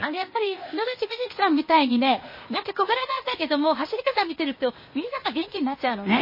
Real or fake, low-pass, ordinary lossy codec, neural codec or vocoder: fake; 5.4 kHz; MP3, 24 kbps; codec, 16 kHz, 0.8 kbps, ZipCodec